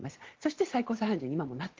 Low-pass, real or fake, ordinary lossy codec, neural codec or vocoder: 7.2 kHz; real; Opus, 16 kbps; none